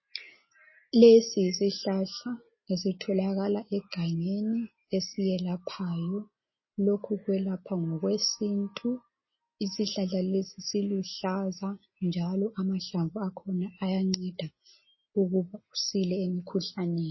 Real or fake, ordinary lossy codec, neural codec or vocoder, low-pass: real; MP3, 24 kbps; none; 7.2 kHz